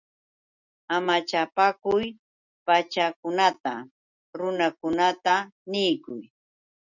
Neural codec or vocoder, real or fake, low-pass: none; real; 7.2 kHz